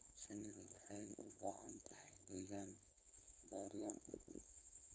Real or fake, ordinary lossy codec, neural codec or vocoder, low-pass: fake; none; codec, 16 kHz, 4.8 kbps, FACodec; none